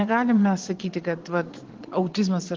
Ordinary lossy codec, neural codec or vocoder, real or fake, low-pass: Opus, 16 kbps; vocoder, 22.05 kHz, 80 mel bands, Vocos; fake; 7.2 kHz